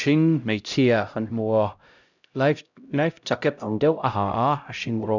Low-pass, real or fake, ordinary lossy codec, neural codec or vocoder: 7.2 kHz; fake; none; codec, 16 kHz, 0.5 kbps, X-Codec, HuBERT features, trained on LibriSpeech